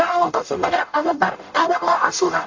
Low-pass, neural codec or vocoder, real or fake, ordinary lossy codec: 7.2 kHz; codec, 44.1 kHz, 0.9 kbps, DAC; fake; AAC, 48 kbps